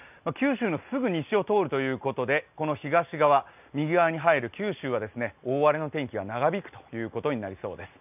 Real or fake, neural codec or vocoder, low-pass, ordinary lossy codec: real; none; 3.6 kHz; none